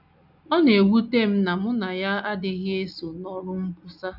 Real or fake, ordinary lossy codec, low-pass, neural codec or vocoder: real; none; 5.4 kHz; none